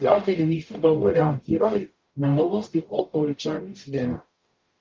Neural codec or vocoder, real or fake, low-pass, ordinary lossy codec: codec, 44.1 kHz, 0.9 kbps, DAC; fake; 7.2 kHz; Opus, 32 kbps